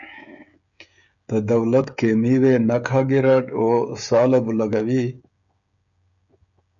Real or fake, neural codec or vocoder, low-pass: fake; codec, 16 kHz, 16 kbps, FreqCodec, smaller model; 7.2 kHz